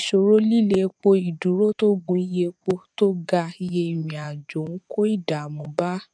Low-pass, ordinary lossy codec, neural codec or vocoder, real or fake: 9.9 kHz; none; vocoder, 24 kHz, 100 mel bands, Vocos; fake